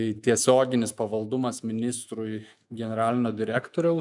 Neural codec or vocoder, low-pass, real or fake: autoencoder, 48 kHz, 128 numbers a frame, DAC-VAE, trained on Japanese speech; 10.8 kHz; fake